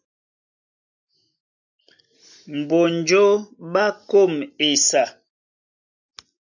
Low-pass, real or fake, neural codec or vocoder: 7.2 kHz; real; none